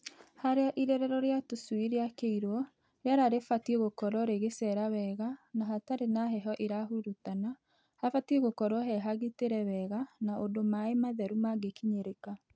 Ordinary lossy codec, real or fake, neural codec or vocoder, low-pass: none; real; none; none